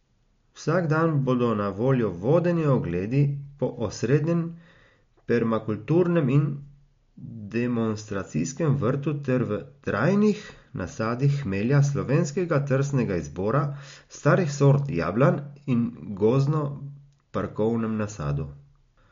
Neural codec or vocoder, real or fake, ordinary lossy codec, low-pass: none; real; MP3, 48 kbps; 7.2 kHz